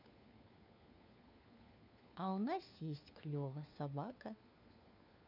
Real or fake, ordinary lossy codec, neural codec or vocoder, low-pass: fake; none; codec, 16 kHz, 4 kbps, FunCodec, trained on LibriTTS, 50 frames a second; 5.4 kHz